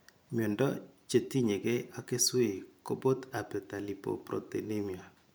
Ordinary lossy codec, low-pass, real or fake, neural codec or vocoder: none; none; fake; vocoder, 44.1 kHz, 128 mel bands every 512 samples, BigVGAN v2